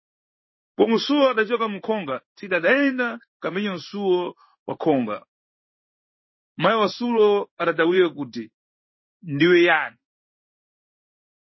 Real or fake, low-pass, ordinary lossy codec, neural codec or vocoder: fake; 7.2 kHz; MP3, 24 kbps; codec, 16 kHz in and 24 kHz out, 1 kbps, XY-Tokenizer